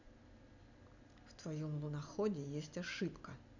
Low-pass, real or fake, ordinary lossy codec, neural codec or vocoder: 7.2 kHz; real; none; none